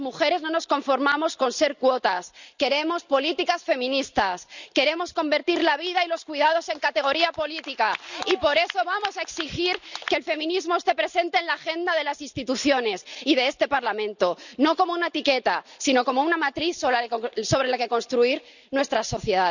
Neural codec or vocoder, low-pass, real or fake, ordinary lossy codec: none; 7.2 kHz; real; none